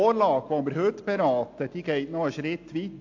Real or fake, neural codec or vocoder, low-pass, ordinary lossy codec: real; none; 7.2 kHz; AAC, 48 kbps